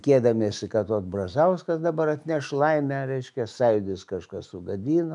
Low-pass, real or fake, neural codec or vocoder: 10.8 kHz; fake; autoencoder, 48 kHz, 128 numbers a frame, DAC-VAE, trained on Japanese speech